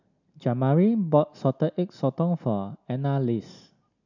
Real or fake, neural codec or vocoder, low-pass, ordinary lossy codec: real; none; 7.2 kHz; none